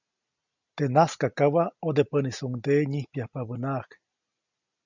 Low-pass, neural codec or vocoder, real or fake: 7.2 kHz; none; real